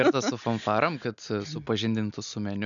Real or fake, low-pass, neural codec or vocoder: real; 7.2 kHz; none